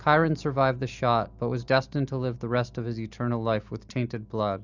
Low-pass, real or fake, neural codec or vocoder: 7.2 kHz; real; none